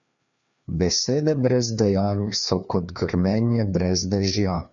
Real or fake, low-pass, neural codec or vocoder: fake; 7.2 kHz; codec, 16 kHz, 2 kbps, FreqCodec, larger model